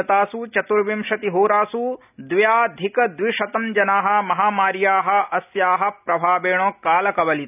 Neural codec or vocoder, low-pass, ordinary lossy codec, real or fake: none; 3.6 kHz; none; real